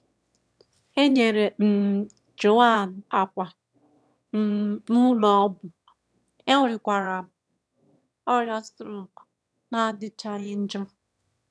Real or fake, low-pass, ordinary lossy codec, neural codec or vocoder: fake; none; none; autoencoder, 22.05 kHz, a latent of 192 numbers a frame, VITS, trained on one speaker